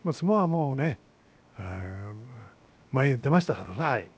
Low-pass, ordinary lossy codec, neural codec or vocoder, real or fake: none; none; codec, 16 kHz, 0.7 kbps, FocalCodec; fake